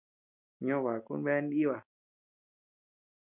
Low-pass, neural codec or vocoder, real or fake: 3.6 kHz; none; real